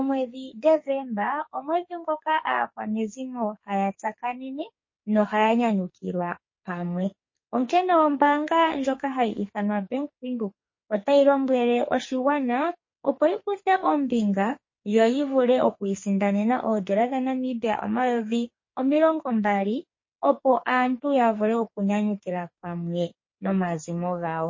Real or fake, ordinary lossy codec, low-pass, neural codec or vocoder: fake; MP3, 32 kbps; 7.2 kHz; codec, 44.1 kHz, 2.6 kbps, SNAC